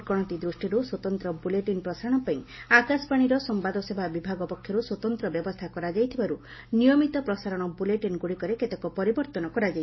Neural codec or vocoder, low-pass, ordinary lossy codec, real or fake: none; 7.2 kHz; MP3, 24 kbps; real